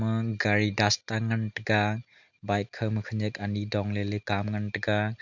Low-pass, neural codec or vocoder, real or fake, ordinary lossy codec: 7.2 kHz; none; real; none